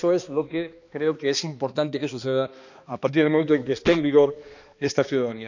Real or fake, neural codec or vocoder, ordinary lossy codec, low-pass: fake; codec, 16 kHz, 2 kbps, X-Codec, HuBERT features, trained on balanced general audio; none; 7.2 kHz